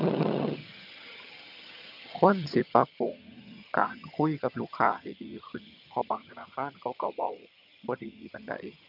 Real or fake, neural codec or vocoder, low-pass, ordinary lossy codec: fake; vocoder, 22.05 kHz, 80 mel bands, HiFi-GAN; 5.4 kHz; none